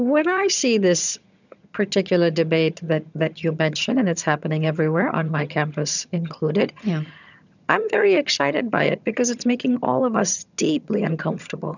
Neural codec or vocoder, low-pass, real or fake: vocoder, 22.05 kHz, 80 mel bands, HiFi-GAN; 7.2 kHz; fake